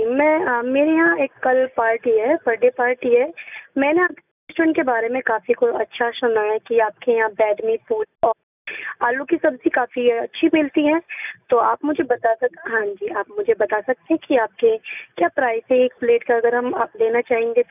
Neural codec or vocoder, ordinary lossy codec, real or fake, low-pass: none; none; real; 3.6 kHz